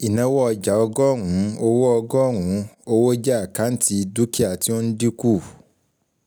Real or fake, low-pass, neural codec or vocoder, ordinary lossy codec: real; none; none; none